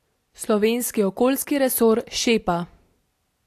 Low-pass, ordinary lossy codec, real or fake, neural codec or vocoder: 14.4 kHz; AAC, 64 kbps; real; none